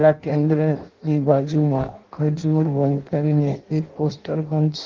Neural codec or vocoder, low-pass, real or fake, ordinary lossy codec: codec, 16 kHz in and 24 kHz out, 0.6 kbps, FireRedTTS-2 codec; 7.2 kHz; fake; Opus, 16 kbps